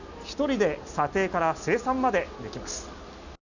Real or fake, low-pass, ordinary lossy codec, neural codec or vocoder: real; 7.2 kHz; none; none